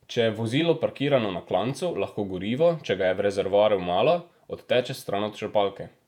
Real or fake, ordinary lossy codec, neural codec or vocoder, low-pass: fake; none; vocoder, 48 kHz, 128 mel bands, Vocos; 19.8 kHz